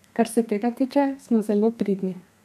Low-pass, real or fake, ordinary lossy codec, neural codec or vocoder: 14.4 kHz; fake; none; codec, 32 kHz, 1.9 kbps, SNAC